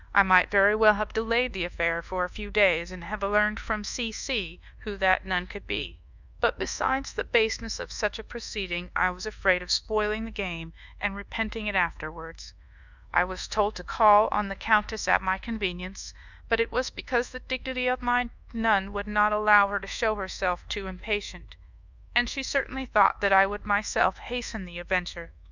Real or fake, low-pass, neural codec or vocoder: fake; 7.2 kHz; codec, 24 kHz, 1.2 kbps, DualCodec